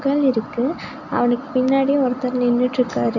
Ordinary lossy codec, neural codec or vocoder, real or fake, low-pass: none; none; real; 7.2 kHz